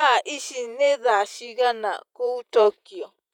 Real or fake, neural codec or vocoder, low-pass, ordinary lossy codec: fake; vocoder, 48 kHz, 128 mel bands, Vocos; 19.8 kHz; none